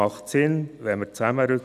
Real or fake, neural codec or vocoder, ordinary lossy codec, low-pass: real; none; none; 14.4 kHz